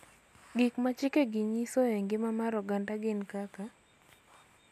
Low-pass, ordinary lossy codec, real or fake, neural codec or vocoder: 14.4 kHz; none; real; none